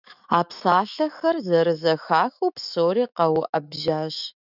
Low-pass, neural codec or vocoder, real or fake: 5.4 kHz; autoencoder, 48 kHz, 128 numbers a frame, DAC-VAE, trained on Japanese speech; fake